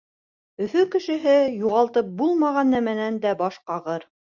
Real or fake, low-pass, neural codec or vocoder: real; 7.2 kHz; none